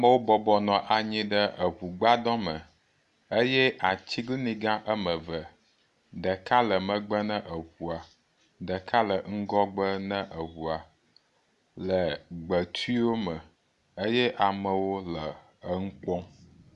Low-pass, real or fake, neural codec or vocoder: 10.8 kHz; real; none